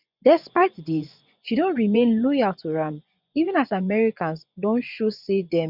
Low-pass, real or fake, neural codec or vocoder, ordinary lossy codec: 5.4 kHz; fake; vocoder, 24 kHz, 100 mel bands, Vocos; none